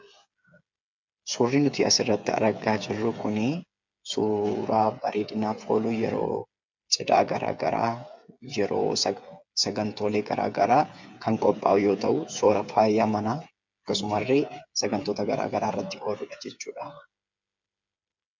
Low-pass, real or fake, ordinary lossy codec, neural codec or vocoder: 7.2 kHz; fake; MP3, 64 kbps; codec, 16 kHz, 8 kbps, FreqCodec, smaller model